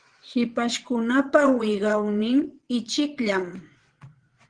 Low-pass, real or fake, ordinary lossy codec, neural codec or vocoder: 9.9 kHz; fake; Opus, 16 kbps; vocoder, 22.05 kHz, 80 mel bands, WaveNeXt